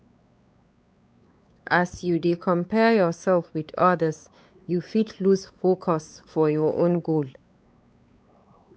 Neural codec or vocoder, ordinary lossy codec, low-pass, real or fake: codec, 16 kHz, 4 kbps, X-Codec, WavLM features, trained on Multilingual LibriSpeech; none; none; fake